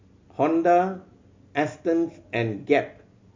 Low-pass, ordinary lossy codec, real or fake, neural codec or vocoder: 7.2 kHz; MP3, 48 kbps; real; none